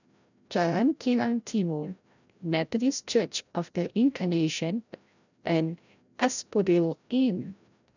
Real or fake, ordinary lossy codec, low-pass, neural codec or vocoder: fake; none; 7.2 kHz; codec, 16 kHz, 0.5 kbps, FreqCodec, larger model